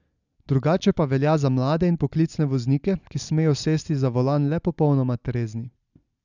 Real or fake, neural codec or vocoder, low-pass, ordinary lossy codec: real; none; 7.2 kHz; none